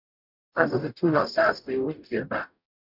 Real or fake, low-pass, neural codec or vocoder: fake; 5.4 kHz; codec, 44.1 kHz, 0.9 kbps, DAC